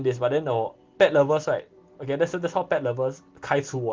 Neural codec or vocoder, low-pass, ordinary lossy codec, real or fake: none; 7.2 kHz; Opus, 16 kbps; real